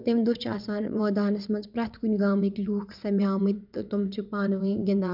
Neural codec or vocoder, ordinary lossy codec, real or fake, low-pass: vocoder, 22.05 kHz, 80 mel bands, WaveNeXt; none; fake; 5.4 kHz